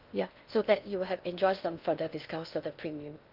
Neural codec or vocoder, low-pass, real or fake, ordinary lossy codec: codec, 16 kHz in and 24 kHz out, 0.6 kbps, FocalCodec, streaming, 2048 codes; 5.4 kHz; fake; Opus, 24 kbps